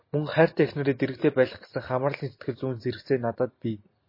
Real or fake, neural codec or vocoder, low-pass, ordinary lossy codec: real; none; 5.4 kHz; MP3, 24 kbps